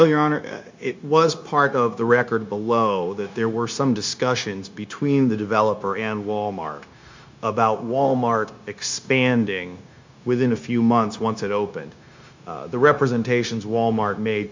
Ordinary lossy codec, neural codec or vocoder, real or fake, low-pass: MP3, 64 kbps; codec, 16 kHz, 0.9 kbps, LongCat-Audio-Codec; fake; 7.2 kHz